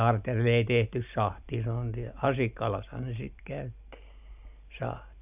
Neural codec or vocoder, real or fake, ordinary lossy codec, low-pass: none; real; none; 3.6 kHz